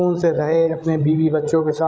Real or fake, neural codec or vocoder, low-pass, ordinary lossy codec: fake; codec, 16 kHz, 16 kbps, FreqCodec, larger model; none; none